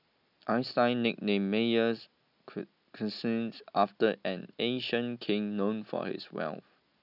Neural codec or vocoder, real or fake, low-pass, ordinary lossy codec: none; real; 5.4 kHz; none